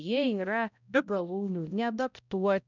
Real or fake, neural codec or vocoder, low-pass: fake; codec, 16 kHz, 0.5 kbps, X-Codec, HuBERT features, trained on balanced general audio; 7.2 kHz